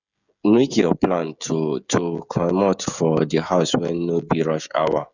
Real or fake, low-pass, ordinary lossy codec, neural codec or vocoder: fake; 7.2 kHz; none; codec, 16 kHz, 16 kbps, FreqCodec, smaller model